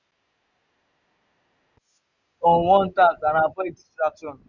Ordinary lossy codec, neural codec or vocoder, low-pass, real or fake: none; none; 7.2 kHz; real